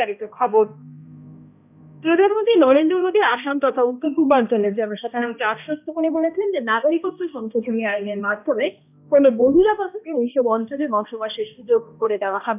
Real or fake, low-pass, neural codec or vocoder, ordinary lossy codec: fake; 3.6 kHz; codec, 16 kHz, 1 kbps, X-Codec, HuBERT features, trained on balanced general audio; none